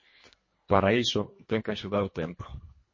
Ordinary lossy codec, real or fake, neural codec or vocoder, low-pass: MP3, 32 kbps; fake; codec, 24 kHz, 1.5 kbps, HILCodec; 7.2 kHz